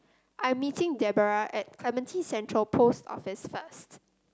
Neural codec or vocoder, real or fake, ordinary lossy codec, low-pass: none; real; none; none